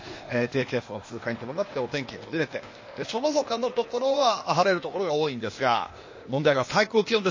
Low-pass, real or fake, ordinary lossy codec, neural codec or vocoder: 7.2 kHz; fake; MP3, 32 kbps; codec, 16 kHz, 0.8 kbps, ZipCodec